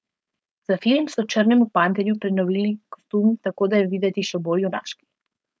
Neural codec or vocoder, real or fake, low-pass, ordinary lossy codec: codec, 16 kHz, 4.8 kbps, FACodec; fake; none; none